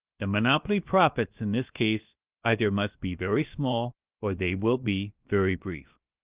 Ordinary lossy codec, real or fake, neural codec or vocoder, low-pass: Opus, 24 kbps; fake; codec, 16 kHz, about 1 kbps, DyCAST, with the encoder's durations; 3.6 kHz